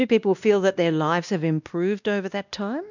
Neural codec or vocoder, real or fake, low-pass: codec, 16 kHz, 1 kbps, X-Codec, WavLM features, trained on Multilingual LibriSpeech; fake; 7.2 kHz